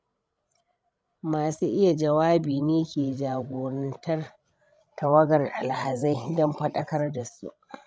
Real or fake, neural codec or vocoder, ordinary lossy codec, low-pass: fake; codec, 16 kHz, 16 kbps, FreqCodec, larger model; none; none